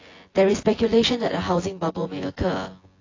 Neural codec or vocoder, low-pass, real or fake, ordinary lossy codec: vocoder, 24 kHz, 100 mel bands, Vocos; 7.2 kHz; fake; AAC, 32 kbps